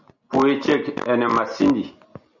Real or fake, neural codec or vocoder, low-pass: real; none; 7.2 kHz